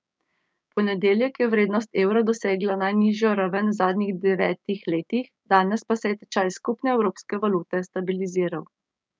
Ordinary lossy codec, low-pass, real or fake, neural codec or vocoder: none; none; fake; codec, 16 kHz, 6 kbps, DAC